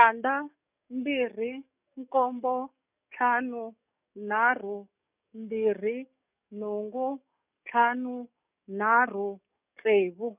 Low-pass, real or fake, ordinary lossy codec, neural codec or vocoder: 3.6 kHz; fake; none; codec, 44.1 kHz, 7.8 kbps, DAC